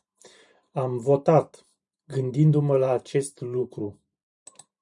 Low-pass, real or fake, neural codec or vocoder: 10.8 kHz; fake; vocoder, 44.1 kHz, 128 mel bands every 512 samples, BigVGAN v2